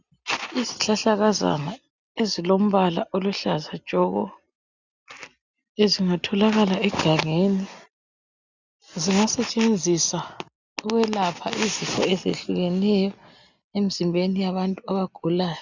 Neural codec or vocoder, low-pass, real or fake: none; 7.2 kHz; real